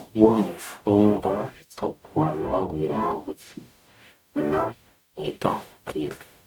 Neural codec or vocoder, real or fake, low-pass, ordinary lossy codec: codec, 44.1 kHz, 0.9 kbps, DAC; fake; 19.8 kHz; none